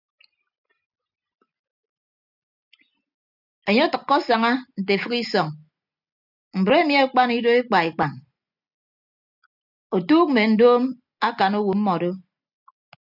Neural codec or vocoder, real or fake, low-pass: none; real; 5.4 kHz